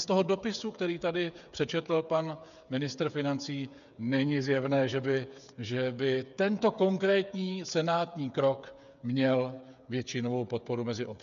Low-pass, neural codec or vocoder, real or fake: 7.2 kHz; codec, 16 kHz, 8 kbps, FreqCodec, smaller model; fake